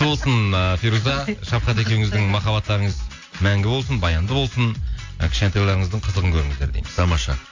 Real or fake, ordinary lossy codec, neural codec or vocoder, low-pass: real; AAC, 48 kbps; none; 7.2 kHz